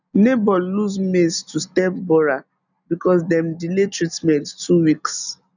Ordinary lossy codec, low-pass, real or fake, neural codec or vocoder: none; 7.2 kHz; real; none